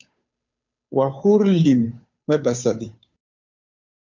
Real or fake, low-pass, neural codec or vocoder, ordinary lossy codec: fake; 7.2 kHz; codec, 16 kHz, 2 kbps, FunCodec, trained on Chinese and English, 25 frames a second; MP3, 64 kbps